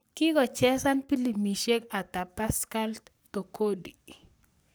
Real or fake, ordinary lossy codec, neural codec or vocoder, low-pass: fake; none; codec, 44.1 kHz, 7.8 kbps, Pupu-Codec; none